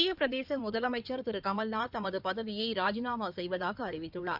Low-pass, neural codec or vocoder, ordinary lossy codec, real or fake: 5.4 kHz; codec, 16 kHz in and 24 kHz out, 2.2 kbps, FireRedTTS-2 codec; none; fake